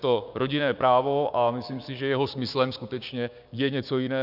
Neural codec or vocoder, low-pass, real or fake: codec, 16 kHz, 6 kbps, DAC; 5.4 kHz; fake